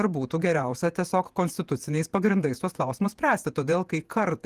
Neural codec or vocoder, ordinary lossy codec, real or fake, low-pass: vocoder, 48 kHz, 128 mel bands, Vocos; Opus, 24 kbps; fake; 14.4 kHz